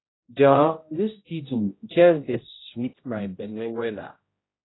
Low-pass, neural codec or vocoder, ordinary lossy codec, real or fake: 7.2 kHz; codec, 16 kHz, 0.5 kbps, X-Codec, HuBERT features, trained on general audio; AAC, 16 kbps; fake